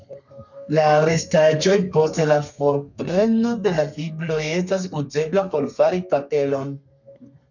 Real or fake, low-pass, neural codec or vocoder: fake; 7.2 kHz; codec, 32 kHz, 1.9 kbps, SNAC